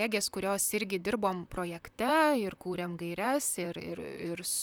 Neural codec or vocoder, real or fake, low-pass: vocoder, 44.1 kHz, 128 mel bands, Pupu-Vocoder; fake; 19.8 kHz